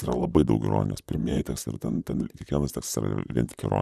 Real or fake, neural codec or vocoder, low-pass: fake; vocoder, 44.1 kHz, 128 mel bands, Pupu-Vocoder; 14.4 kHz